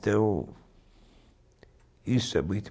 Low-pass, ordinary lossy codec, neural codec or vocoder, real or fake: none; none; none; real